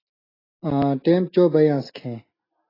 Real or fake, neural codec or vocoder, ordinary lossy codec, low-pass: real; none; AAC, 24 kbps; 5.4 kHz